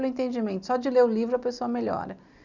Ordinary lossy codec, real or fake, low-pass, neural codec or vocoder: none; real; 7.2 kHz; none